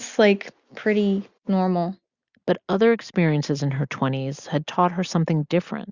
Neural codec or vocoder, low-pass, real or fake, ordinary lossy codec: none; 7.2 kHz; real; Opus, 64 kbps